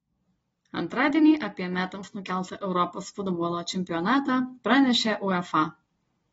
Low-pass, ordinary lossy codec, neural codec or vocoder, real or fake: 19.8 kHz; AAC, 24 kbps; none; real